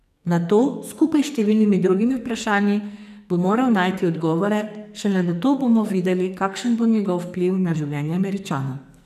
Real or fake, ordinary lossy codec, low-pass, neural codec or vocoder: fake; none; 14.4 kHz; codec, 44.1 kHz, 2.6 kbps, SNAC